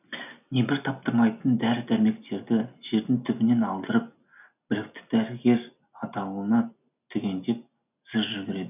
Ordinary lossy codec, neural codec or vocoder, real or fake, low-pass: none; none; real; 3.6 kHz